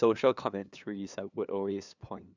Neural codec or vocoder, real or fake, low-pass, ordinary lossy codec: codec, 16 kHz, 2 kbps, FunCodec, trained on LibriTTS, 25 frames a second; fake; 7.2 kHz; none